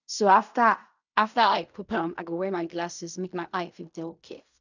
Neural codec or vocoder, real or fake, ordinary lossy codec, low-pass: codec, 16 kHz in and 24 kHz out, 0.4 kbps, LongCat-Audio-Codec, fine tuned four codebook decoder; fake; none; 7.2 kHz